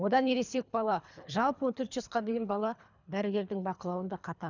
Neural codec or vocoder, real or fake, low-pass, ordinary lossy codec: codec, 24 kHz, 3 kbps, HILCodec; fake; 7.2 kHz; none